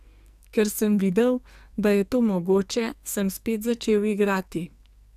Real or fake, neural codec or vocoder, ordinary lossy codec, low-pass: fake; codec, 44.1 kHz, 2.6 kbps, SNAC; none; 14.4 kHz